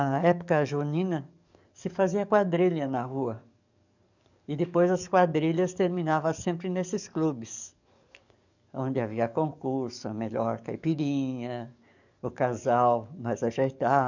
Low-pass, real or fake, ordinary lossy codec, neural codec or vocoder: 7.2 kHz; fake; none; codec, 44.1 kHz, 7.8 kbps, DAC